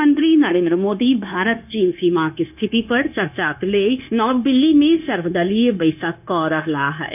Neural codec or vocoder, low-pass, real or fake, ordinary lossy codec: codec, 16 kHz, 0.9 kbps, LongCat-Audio-Codec; 3.6 kHz; fake; none